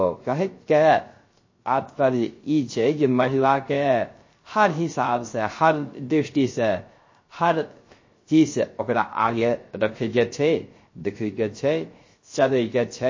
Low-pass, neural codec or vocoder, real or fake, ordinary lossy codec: 7.2 kHz; codec, 16 kHz, 0.3 kbps, FocalCodec; fake; MP3, 32 kbps